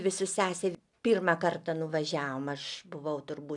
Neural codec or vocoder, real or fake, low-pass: none; real; 10.8 kHz